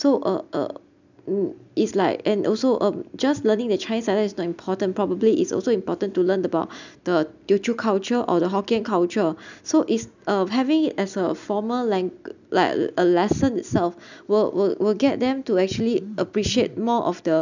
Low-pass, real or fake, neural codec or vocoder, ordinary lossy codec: 7.2 kHz; real; none; none